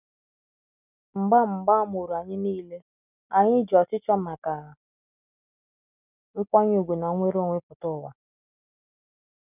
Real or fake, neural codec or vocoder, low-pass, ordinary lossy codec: real; none; 3.6 kHz; none